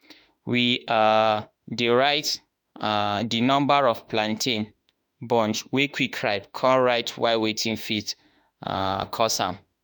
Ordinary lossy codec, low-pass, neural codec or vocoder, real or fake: none; none; autoencoder, 48 kHz, 32 numbers a frame, DAC-VAE, trained on Japanese speech; fake